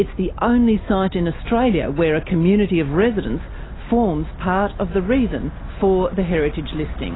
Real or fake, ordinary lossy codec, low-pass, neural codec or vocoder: real; AAC, 16 kbps; 7.2 kHz; none